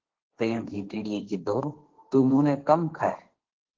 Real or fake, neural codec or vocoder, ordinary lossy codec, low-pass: fake; codec, 16 kHz, 1.1 kbps, Voila-Tokenizer; Opus, 16 kbps; 7.2 kHz